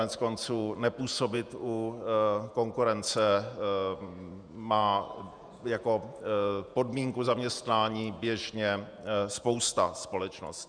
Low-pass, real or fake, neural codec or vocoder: 9.9 kHz; fake; vocoder, 48 kHz, 128 mel bands, Vocos